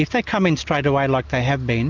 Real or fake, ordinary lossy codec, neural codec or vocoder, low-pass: real; MP3, 64 kbps; none; 7.2 kHz